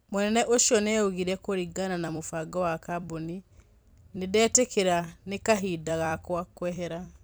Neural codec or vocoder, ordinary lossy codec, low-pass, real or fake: vocoder, 44.1 kHz, 128 mel bands every 256 samples, BigVGAN v2; none; none; fake